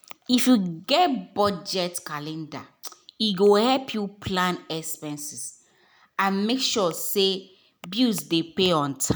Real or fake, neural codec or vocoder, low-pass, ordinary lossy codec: real; none; none; none